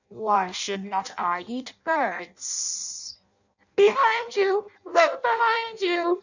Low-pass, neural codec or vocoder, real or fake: 7.2 kHz; codec, 16 kHz in and 24 kHz out, 0.6 kbps, FireRedTTS-2 codec; fake